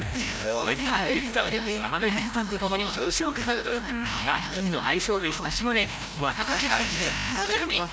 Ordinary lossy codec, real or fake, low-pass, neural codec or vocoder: none; fake; none; codec, 16 kHz, 0.5 kbps, FreqCodec, larger model